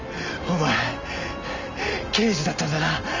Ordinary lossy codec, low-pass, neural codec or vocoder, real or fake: Opus, 32 kbps; 7.2 kHz; none; real